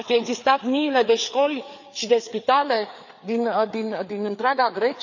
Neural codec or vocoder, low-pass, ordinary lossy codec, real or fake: codec, 16 kHz, 4 kbps, FreqCodec, larger model; 7.2 kHz; none; fake